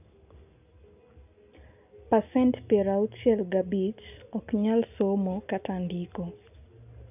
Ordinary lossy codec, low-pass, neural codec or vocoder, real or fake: none; 3.6 kHz; none; real